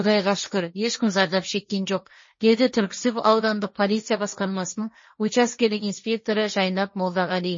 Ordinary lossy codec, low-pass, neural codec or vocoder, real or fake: MP3, 32 kbps; 7.2 kHz; codec, 16 kHz, 1.1 kbps, Voila-Tokenizer; fake